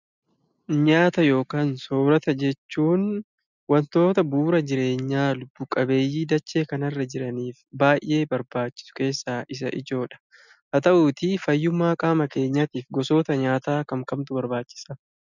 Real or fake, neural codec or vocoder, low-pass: real; none; 7.2 kHz